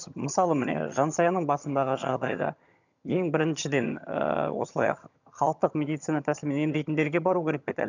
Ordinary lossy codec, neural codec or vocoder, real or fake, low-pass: none; vocoder, 22.05 kHz, 80 mel bands, HiFi-GAN; fake; 7.2 kHz